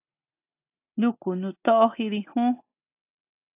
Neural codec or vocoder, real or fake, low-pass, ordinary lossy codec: none; real; 3.6 kHz; AAC, 32 kbps